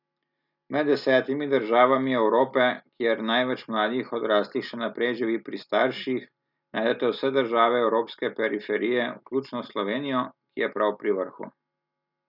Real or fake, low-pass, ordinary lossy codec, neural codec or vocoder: real; 5.4 kHz; none; none